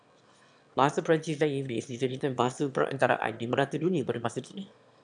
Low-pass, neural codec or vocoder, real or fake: 9.9 kHz; autoencoder, 22.05 kHz, a latent of 192 numbers a frame, VITS, trained on one speaker; fake